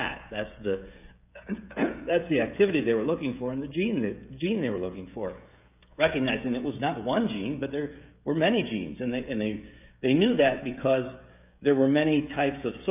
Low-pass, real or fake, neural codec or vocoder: 3.6 kHz; fake; codec, 16 kHz, 16 kbps, FreqCodec, smaller model